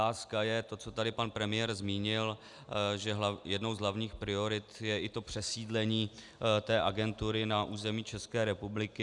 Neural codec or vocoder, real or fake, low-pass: none; real; 10.8 kHz